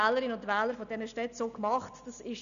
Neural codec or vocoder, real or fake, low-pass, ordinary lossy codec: none; real; 7.2 kHz; none